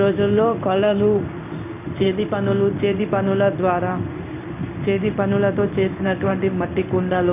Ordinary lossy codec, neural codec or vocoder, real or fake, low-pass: none; codec, 16 kHz in and 24 kHz out, 1 kbps, XY-Tokenizer; fake; 3.6 kHz